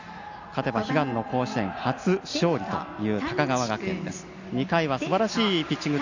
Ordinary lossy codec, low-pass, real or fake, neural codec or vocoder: AAC, 48 kbps; 7.2 kHz; real; none